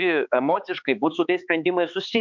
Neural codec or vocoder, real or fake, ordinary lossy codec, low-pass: codec, 16 kHz, 4 kbps, X-Codec, HuBERT features, trained on balanced general audio; fake; MP3, 64 kbps; 7.2 kHz